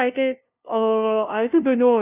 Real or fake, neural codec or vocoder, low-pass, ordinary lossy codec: fake; codec, 16 kHz, 0.5 kbps, FunCodec, trained on LibriTTS, 25 frames a second; 3.6 kHz; none